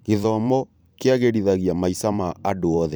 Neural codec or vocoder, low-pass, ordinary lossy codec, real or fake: vocoder, 44.1 kHz, 128 mel bands every 512 samples, BigVGAN v2; none; none; fake